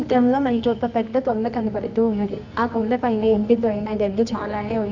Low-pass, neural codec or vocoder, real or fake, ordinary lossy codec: 7.2 kHz; codec, 24 kHz, 0.9 kbps, WavTokenizer, medium music audio release; fake; none